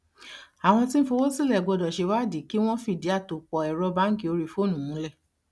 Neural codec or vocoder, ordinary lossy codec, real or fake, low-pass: none; none; real; none